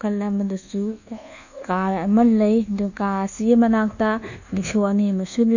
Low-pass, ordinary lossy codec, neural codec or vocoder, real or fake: 7.2 kHz; none; codec, 24 kHz, 1.2 kbps, DualCodec; fake